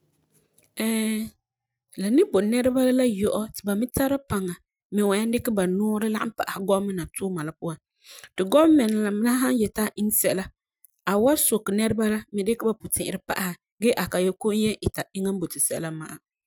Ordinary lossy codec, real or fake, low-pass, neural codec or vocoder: none; real; none; none